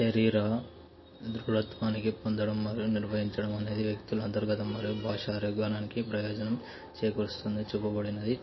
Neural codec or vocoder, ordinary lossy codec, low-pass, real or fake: none; MP3, 24 kbps; 7.2 kHz; real